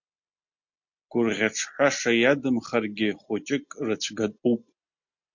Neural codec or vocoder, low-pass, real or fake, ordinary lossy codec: none; 7.2 kHz; real; MP3, 48 kbps